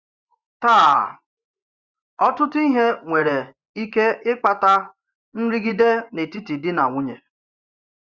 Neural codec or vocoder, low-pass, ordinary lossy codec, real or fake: vocoder, 24 kHz, 100 mel bands, Vocos; 7.2 kHz; Opus, 64 kbps; fake